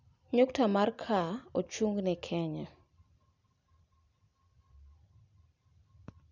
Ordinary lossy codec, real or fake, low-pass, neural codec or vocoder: none; real; 7.2 kHz; none